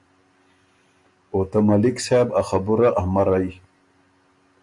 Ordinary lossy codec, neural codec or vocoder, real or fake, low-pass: MP3, 64 kbps; none; real; 10.8 kHz